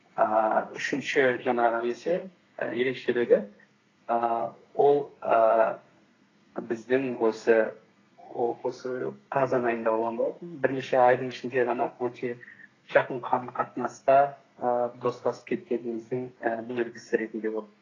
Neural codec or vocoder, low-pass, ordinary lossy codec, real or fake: codec, 32 kHz, 1.9 kbps, SNAC; 7.2 kHz; AAC, 32 kbps; fake